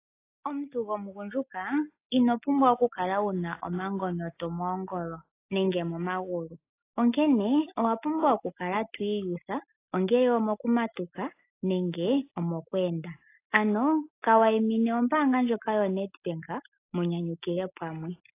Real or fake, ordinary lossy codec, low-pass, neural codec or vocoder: real; AAC, 24 kbps; 3.6 kHz; none